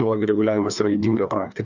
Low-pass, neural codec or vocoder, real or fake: 7.2 kHz; codec, 16 kHz, 2 kbps, FreqCodec, larger model; fake